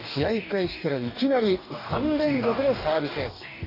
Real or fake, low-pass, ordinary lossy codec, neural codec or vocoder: fake; 5.4 kHz; none; codec, 44.1 kHz, 2.6 kbps, DAC